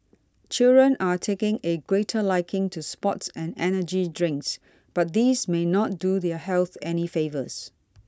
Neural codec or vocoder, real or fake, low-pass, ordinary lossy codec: none; real; none; none